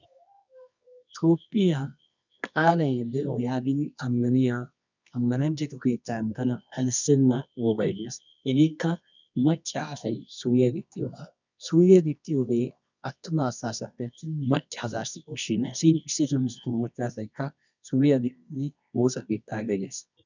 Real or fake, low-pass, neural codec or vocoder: fake; 7.2 kHz; codec, 24 kHz, 0.9 kbps, WavTokenizer, medium music audio release